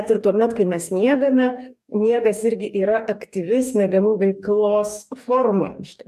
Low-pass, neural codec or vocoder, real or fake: 14.4 kHz; codec, 44.1 kHz, 2.6 kbps, DAC; fake